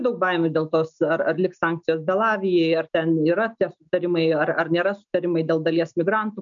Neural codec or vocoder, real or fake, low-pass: none; real; 7.2 kHz